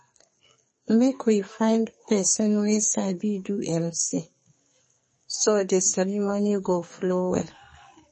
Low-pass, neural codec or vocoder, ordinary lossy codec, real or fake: 10.8 kHz; codec, 44.1 kHz, 2.6 kbps, SNAC; MP3, 32 kbps; fake